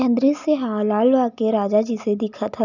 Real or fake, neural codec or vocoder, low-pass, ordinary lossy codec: real; none; 7.2 kHz; none